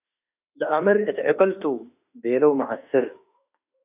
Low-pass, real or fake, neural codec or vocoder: 3.6 kHz; fake; autoencoder, 48 kHz, 32 numbers a frame, DAC-VAE, trained on Japanese speech